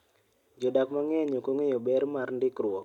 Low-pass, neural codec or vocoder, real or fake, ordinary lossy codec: 19.8 kHz; none; real; none